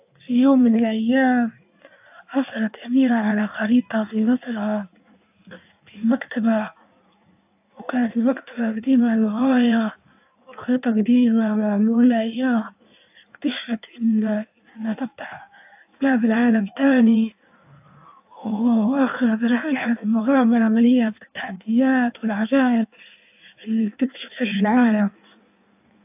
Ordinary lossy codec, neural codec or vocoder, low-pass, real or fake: AAC, 32 kbps; codec, 16 kHz in and 24 kHz out, 1.1 kbps, FireRedTTS-2 codec; 3.6 kHz; fake